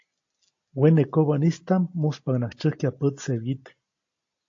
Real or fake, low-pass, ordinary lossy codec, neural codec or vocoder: real; 7.2 kHz; AAC, 48 kbps; none